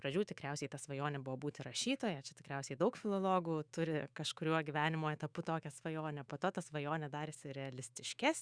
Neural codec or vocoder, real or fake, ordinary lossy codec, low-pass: autoencoder, 48 kHz, 128 numbers a frame, DAC-VAE, trained on Japanese speech; fake; Opus, 64 kbps; 9.9 kHz